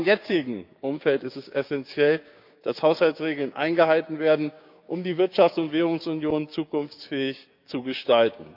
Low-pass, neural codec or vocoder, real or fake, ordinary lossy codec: 5.4 kHz; codec, 16 kHz, 6 kbps, DAC; fake; none